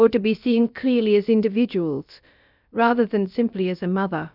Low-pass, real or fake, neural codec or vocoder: 5.4 kHz; fake; codec, 16 kHz, about 1 kbps, DyCAST, with the encoder's durations